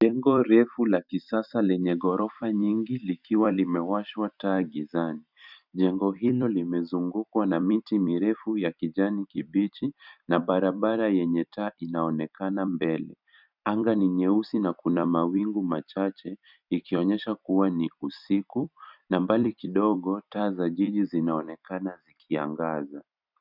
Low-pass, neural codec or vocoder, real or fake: 5.4 kHz; vocoder, 44.1 kHz, 128 mel bands every 256 samples, BigVGAN v2; fake